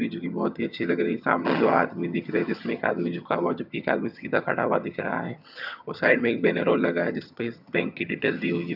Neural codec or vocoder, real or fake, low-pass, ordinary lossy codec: vocoder, 22.05 kHz, 80 mel bands, HiFi-GAN; fake; 5.4 kHz; none